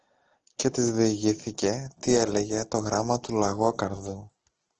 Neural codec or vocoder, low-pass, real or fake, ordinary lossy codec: none; 7.2 kHz; real; Opus, 16 kbps